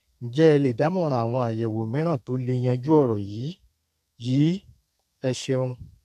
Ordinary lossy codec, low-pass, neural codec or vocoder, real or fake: none; 14.4 kHz; codec, 32 kHz, 1.9 kbps, SNAC; fake